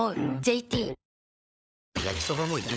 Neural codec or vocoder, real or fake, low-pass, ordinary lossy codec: codec, 16 kHz, 16 kbps, FunCodec, trained on LibriTTS, 50 frames a second; fake; none; none